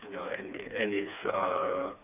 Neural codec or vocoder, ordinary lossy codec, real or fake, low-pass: codec, 16 kHz, 2 kbps, FreqCodec, smaller model; none; fake; 3.6 kHz